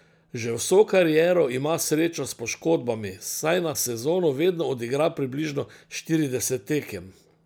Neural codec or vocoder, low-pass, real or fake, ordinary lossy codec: none; none; real; none